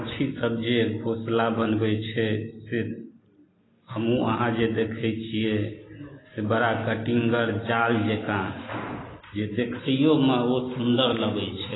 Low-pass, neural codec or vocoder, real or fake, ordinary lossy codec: 7.2 kHz; none; real; AAC, 16 kbps